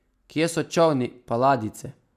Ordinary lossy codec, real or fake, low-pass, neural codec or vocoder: none; real; 14.4 kHz; none